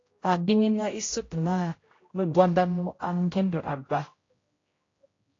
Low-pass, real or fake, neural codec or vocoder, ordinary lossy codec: 7.2 kHz; fake; codec, 16 kHz, 0.5 kbps, X-Codec, HuBERT features, trained on general audio; AAC, 32 kbps